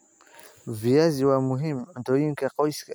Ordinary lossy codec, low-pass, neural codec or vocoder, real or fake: none; none; none; real